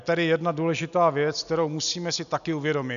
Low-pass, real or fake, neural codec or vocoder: 7.2 kHz; real; none